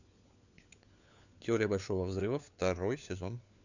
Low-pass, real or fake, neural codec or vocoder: 7.2 kHz; fake; codec, 16 kHz, 4 kbps, FunCodec, trained on LibriTTS, 50 frames a second